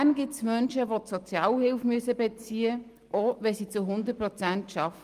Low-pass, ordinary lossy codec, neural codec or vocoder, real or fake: 14.4 kHz; Opus, 32 kbps; none; real